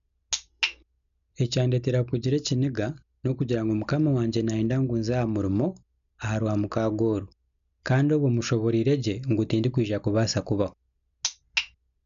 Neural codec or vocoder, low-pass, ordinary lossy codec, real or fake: none; 7.2 kHz; none; real